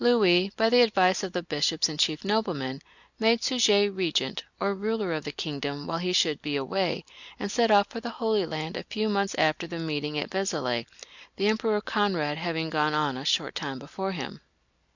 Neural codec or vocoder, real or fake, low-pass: none; real; 7.2 kHz